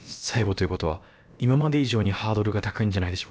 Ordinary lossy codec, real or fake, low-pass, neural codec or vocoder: none; fake; none; codec, 16 kHz, about 1 kbps, DyCAST, with the encoder's durations